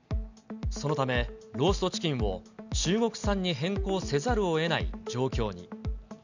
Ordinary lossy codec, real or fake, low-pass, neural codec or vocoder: none; real; 7.2 kHz; none